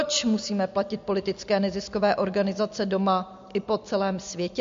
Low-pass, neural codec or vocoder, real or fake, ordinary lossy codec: 7.2 kHz; none; real; MP3, 48 kbps